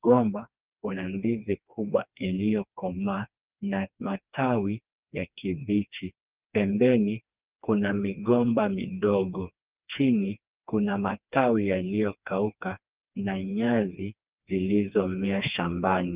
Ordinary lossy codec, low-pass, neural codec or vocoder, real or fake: Opus, 32 kbps; 3.6 kHz; codec, 16 kHz, 2 kbps, FreqCodec, smaller model; fake